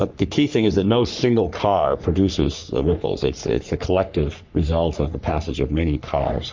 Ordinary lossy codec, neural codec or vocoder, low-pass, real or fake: MP3, 64 kbps; codec, 44.1 kHz, 3.4 kbps, Pupu-Codec; 7.2 kHz; fake